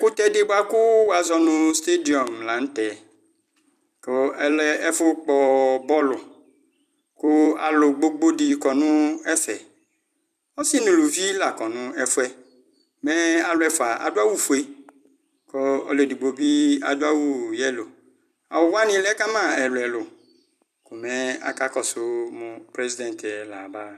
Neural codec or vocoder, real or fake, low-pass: vocoder, 44.1 kHz, 128 mel bands every 256 samples, BigVGAN v2; fake; 14.4 kHz